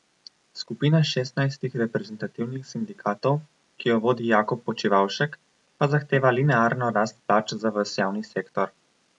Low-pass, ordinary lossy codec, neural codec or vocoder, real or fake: 10.8 kHz; none; none; real